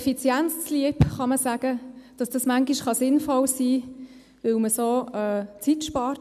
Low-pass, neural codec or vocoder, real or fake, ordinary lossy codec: 14.4 kHz; none; real; none